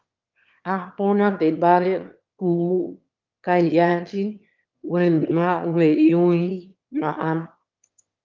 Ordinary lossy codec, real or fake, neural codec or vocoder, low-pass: Opus, 24 kbps; fake; autoencoder, 22.05 kHz, a latent of 192 numbers a frame, VITS, trained on one speaker; 7.2 kHz